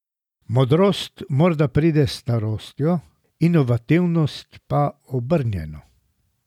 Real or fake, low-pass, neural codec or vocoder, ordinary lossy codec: real; 19.8 kHz; none; none